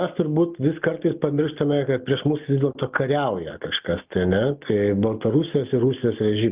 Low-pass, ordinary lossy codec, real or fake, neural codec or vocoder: 3.6 kHz; Opus, 24 kbps; real; none